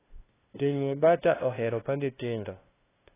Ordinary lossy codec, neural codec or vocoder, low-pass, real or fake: AAC, 16 kbps; codec, 16 kHz, 0.5 kbps, FunCodec, trained on LibriTTS, 25 frames a second; 3.6 kHz; fake